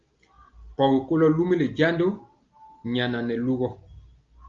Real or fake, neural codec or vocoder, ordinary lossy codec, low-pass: real; none; Opus, 32 kbps; 7.2 kHz